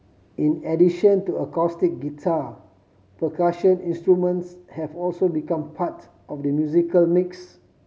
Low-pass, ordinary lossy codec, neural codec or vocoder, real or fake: none; none; none; real